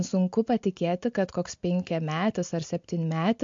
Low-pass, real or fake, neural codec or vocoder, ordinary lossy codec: 7.2 kHz; real; none; MP3, 48 kbps